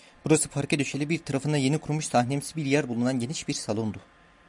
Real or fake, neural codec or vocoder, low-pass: real; none; 10.8 kHz